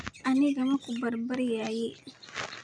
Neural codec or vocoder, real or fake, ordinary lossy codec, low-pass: none; real; none; 9.9 kHz